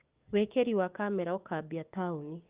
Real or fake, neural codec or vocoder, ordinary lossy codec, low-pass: fake; codec, 16 kHz, 6 kbps, DAC; Opus, 32 kbps; 3.6 kHz